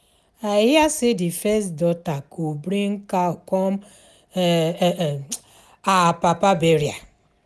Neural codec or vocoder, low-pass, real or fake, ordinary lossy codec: none; none; real; none